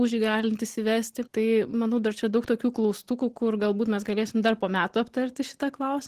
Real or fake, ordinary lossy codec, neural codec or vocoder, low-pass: real; Opus, 16 kbps; none; 14.4 kHz